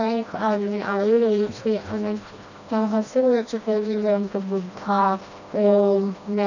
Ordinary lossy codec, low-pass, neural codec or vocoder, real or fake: none; 7.2 kHz; codec, 16 kHz, 1 kbps, FreqCodec, smaller model; fake